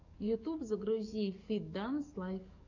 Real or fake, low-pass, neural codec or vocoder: fake; 7.2 kHz; codec, 44.1 kHz, 7.8 kbps, DAC